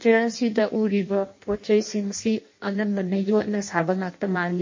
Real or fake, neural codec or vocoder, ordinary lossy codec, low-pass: fake; codec, 16 kHz in and 24 kHz out, 0.6 kbps, FireRedTTS-2 codec; MP3, 32 kbps; 7.2 kHz